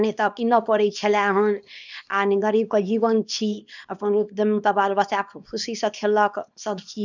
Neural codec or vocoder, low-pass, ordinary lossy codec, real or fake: codec, 24 kHz, 0.9 kbps, WavTokenizer, small release; 7.2 kHz; none; fake